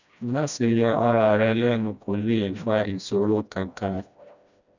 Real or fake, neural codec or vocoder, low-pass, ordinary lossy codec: fake; codec, 16 kHz, 1 kbps, FreqCodec, smaller model; 7.2 kHz; none